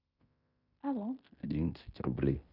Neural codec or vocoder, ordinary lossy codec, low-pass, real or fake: codec, 16 kHz in and 24 kHz out, 0.9 kbps, LongCat-Audio-Codec, fine tuned four codebook decoder; none; 5.4 kHz; fake